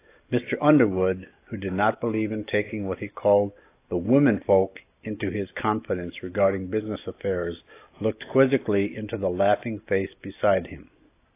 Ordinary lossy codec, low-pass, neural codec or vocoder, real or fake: AAC, 24 kbps; 3.6 kHz; none; real